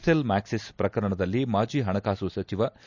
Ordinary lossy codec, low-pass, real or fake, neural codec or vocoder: none; 7.2 kHz; real; none